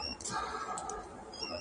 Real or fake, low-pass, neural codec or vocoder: fake; 9.9 kHz; vocoder, 22.05 kHz, 80 mel bands, Vocos